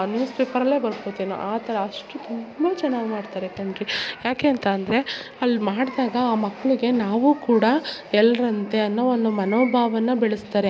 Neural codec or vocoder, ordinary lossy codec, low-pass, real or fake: none; none; none; real